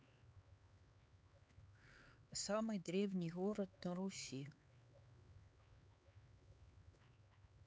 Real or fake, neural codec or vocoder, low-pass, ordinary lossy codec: fake; codec, 16 kHz, 4 kbps, X-Codec, HuBERT features, trained on LibriSpeech; none; none